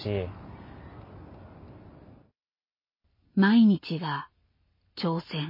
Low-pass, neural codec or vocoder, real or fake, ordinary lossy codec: 5.4 kHz; none; real; MP3, 24 kbps